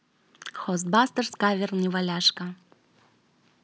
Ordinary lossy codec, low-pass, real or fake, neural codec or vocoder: none; none; real; none